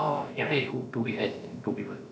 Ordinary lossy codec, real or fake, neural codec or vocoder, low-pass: none; fake; codec, 16 kHz, about 1 kbps, DyCAST, with the encoder's durations; none